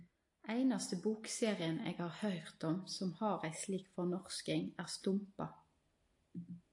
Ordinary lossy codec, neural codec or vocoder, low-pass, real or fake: AAC, 48 kbps; none; 10.8 kHz; real